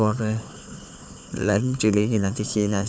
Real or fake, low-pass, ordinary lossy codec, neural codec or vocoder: fake; none; none; codec, 16 kHz, 4 kbps, FunCodec, trained on Chinese and English, 50 frames a second